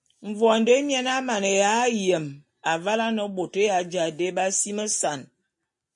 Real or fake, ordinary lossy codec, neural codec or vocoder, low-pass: real; MP3, 48 kbps; none; 10.8 kHz